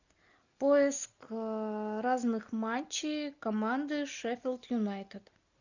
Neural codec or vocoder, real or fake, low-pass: none; real; 7.2 kHz